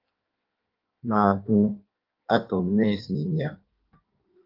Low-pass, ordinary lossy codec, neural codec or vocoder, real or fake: 5.4 kHz; Opus, 32 kbps; codec, 16 kHz in and 24 kHz out, 1.1 kbps, FireRedTTS-2 codec; fake